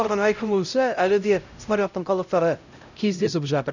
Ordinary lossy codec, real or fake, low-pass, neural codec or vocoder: none; fake; 7.2 kHz; codec, 16 kHz, 0.5 kbps, X-Codec, HuBERT features, trained on LibriSpeech